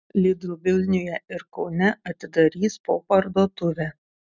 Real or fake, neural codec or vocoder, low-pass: real; none; 7.2 kHz